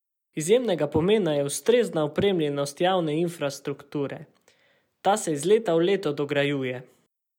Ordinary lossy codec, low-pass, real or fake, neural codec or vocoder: none; 19.8 kHz; real; none